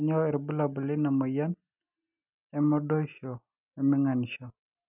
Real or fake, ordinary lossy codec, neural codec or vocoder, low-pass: real; none; none; 3.6 kHz